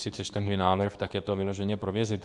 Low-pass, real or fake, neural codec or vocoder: 10.8 kHz; fake; codec, 24 kHz, 0.9 kbps, WavTokenizer, medium speech release version 2